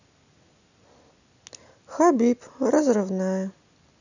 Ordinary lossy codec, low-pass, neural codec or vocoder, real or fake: none; 7.2 kHz; none; real